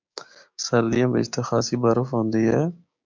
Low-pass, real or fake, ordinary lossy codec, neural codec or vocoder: 7.2 kHz; fake; MP3, 64 kbps; codec, 16 kHz, 6 kbps, DAC